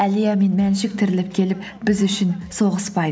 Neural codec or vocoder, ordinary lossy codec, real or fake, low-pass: none; none; real; none